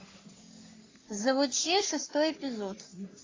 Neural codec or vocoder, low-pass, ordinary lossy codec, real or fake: codec, 44.1 kHz, 3.4 kbps, Pupu-Codec; 7.2 kHz; AAC, 32 kbps; fake